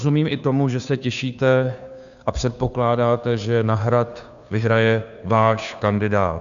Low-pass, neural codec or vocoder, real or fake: 7.2 kHz; codec, 16 kHz, 2 kbps, FunCodec, trained on Chinese and English, 25 frames a second; fake